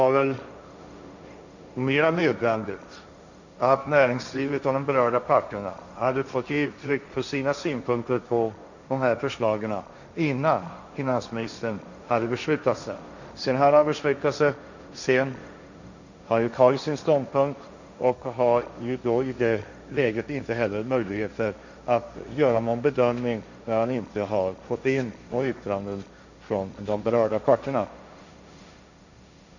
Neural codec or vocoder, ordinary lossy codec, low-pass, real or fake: codec, 16 kHz, 1.1 kbps, Voila-Tokenizer; none; 7.2 kHz; fake